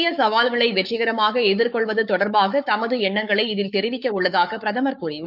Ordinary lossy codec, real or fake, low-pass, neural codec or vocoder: none; fake; 5.4 kHz; codec, 44.1 kHz, 7.8 kbps, Pupu-Codec